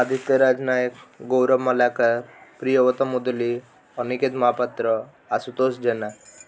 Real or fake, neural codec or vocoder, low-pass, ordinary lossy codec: real; none; none; none